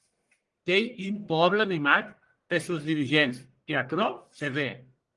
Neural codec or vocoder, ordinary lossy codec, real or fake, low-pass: codec, 44.1 kHz, 1.7 kbps, Pupu-Codec; Opus, 24 kbps; fake; 10.8 kHz